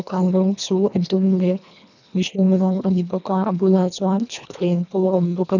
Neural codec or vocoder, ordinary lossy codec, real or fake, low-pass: codec, 24 kHz, 1.5 kbps, HILCodec; none; fake; 7.2 kHz